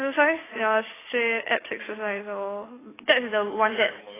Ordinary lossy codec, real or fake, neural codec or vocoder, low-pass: AAC, 16 kbps; fake; codec, 44.1 kHz, 7.8 kbps, DAC; 3.6 kHz